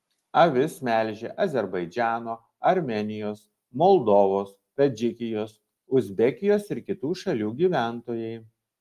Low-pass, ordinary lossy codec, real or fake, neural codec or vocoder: 14.4 kHz; Opus, 32 kbps; real; none